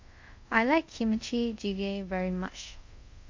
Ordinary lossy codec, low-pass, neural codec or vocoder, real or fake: MP3, 48 kbps; 7.2 kHz; codec, 24 kHz, 0.5 kbps, DualCodec; fake